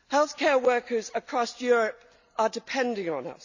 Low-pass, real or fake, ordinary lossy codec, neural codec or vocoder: 7.2 kHz; real; none; none